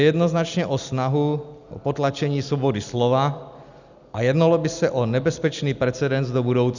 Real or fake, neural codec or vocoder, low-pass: real; none; 7.2 kHz